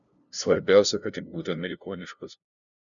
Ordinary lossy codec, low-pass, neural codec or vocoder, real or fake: MP3, 96 kbps; 7.2 kHz; codec, 16 kHz, 0.5 kbps, FunCodec, trained on LibriTTS, 25 frames a second; fake